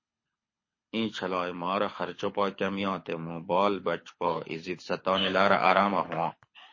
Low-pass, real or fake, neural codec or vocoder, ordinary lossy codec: 7.2 kHz; fake; codec, 24 kHz, 6 kbps, HILCodec; MP3, 32 kbps